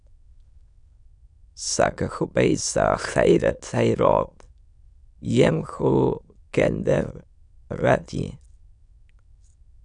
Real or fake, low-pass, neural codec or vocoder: fake; 9.9 kHz; autoencoder, 22.05 kHz, a latent of 192 numbers a frame, VITS, trained on many speakers